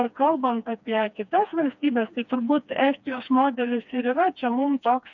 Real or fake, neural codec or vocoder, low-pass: fake; codec, 16 kHz, 2 kbps, FreqCodec, smaller model; 7.2 kHz